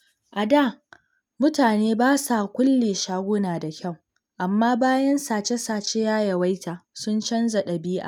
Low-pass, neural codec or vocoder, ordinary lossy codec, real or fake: 19.8 kHz; none; none; real